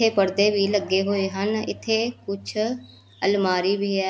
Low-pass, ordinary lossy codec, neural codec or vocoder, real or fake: none; none; none; real